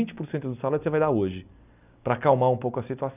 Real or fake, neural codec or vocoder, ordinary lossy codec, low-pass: real; none; none; 3.6 kHz